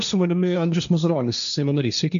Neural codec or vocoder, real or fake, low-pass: codec, 16 kHz, 1.1 kbps, Voila-Tokenizer; fake; 7.2 kHz